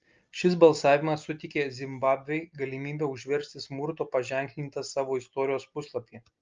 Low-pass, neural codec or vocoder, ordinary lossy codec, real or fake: 7.2 kHz; none; Opus, 24 kbps; real